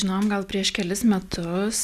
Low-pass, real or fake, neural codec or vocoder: 14.4 kHz; real; none